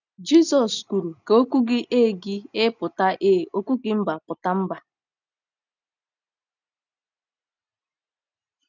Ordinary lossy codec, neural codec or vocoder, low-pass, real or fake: none; none; 7.2 kHz; real